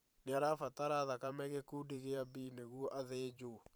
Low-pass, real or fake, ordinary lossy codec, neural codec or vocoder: none; fake; none; vocoder, 44.1 kHz, 128 mel bands every 512 samples, BigVGAN v2